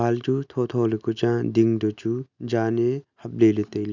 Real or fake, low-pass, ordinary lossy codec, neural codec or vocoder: real; 7.2 kHz; none; none